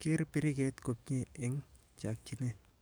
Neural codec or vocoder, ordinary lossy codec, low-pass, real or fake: codec, 44.1 kHz, 7.8 kbps, DAC; none; none; fake